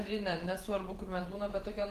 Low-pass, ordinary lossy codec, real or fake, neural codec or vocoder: 14.4 kHz; Opus, 16 kbps; fake; vocoder, 48 kHz, 128 mel bands, Vocos